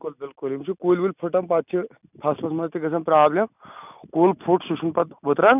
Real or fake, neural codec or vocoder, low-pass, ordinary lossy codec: real; none; 3.6 kHz; none